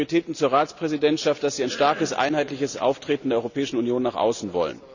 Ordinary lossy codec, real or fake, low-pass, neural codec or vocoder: none; real; 7.2 kHz; none